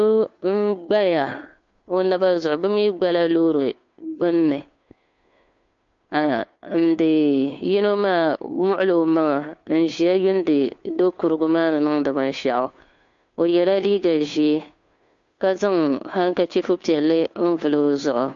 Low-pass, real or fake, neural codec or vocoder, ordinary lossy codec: 7.2 kHz; fake; codec, 16 kHz, 2 kbps, FunCodec, trained on Chinese and English, 25 frames a second; MP3, 48 kbps